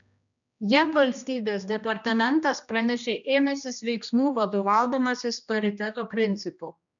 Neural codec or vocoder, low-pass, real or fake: codec, 16 kHz, 1 kbps, X-Codec, HuBERT features, trained on general audio; 7.2 kHz; fake